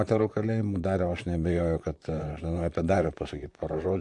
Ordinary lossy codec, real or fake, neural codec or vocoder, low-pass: AAC, 64 kbps; fake; vocoder, 44.1 kHz, 128 mel bands, Pupu-Vocoder; 10.8 kHz